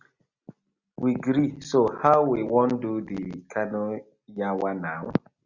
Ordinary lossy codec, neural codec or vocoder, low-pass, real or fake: Opus, 64 kbps; none; 7.2 kHz; real